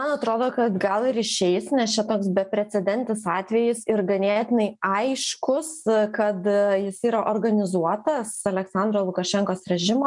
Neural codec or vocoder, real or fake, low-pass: none; real; 10.8 kHz